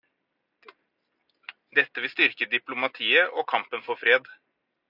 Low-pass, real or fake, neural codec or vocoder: 5.4 kHz; real; none